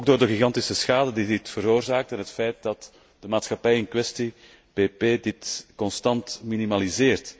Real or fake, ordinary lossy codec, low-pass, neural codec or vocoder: real; none; none; none